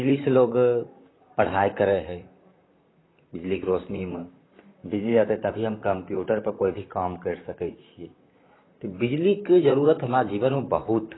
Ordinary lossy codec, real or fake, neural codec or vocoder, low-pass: AAC, 16 kbps; fake; vocoder, 44.1 kHz, 128 mel bands, Pupu-Vocoder; 7.2 kHz